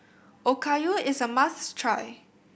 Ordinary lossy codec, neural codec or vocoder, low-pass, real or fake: none; none; none; real